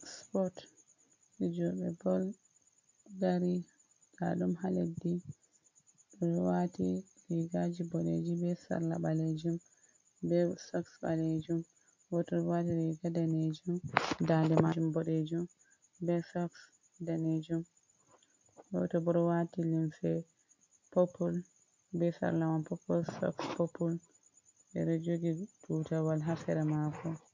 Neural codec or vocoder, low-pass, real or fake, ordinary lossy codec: none; 7.2 kHz; real; MP3, 48 kbps